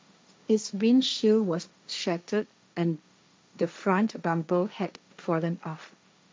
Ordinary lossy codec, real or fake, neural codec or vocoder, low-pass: none; fake; codec, 16 kHz, 1.1 kbps, Voila-Tokenizer; none